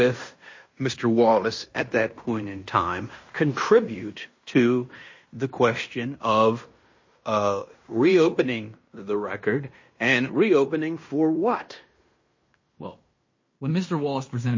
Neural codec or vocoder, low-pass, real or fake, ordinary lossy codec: codec, 16 kHz in and 24 kHz out, 0.9 kbps, LongCat-Audio-Codec, fine tuned four codebook decoder; 7.2 kHz; fake; MP3, 32 kbps